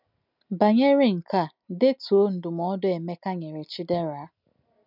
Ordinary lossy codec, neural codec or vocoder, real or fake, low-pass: none; none; real; 5.4 kHz